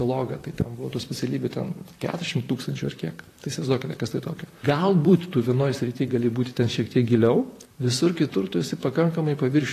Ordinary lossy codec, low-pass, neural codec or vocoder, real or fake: AAC, 48 kbps; 14.4 kHz; vocoder, 44.1 kHz, 128 mel bands, Pupu-Vocoder; fake